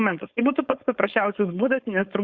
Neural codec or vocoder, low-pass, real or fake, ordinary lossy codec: codec, 16 kHz, 4.8 kbps, FACodec; 7.2 kHz; fake; Opus, 64 kbps